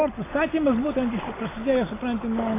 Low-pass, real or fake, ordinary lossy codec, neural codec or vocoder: 3.6 kHz; real; AAC, 16 kbps; none